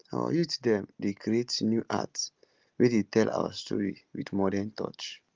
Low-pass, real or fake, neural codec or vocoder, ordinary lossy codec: 7.2 kHz; real; none; Opus, 32 kbps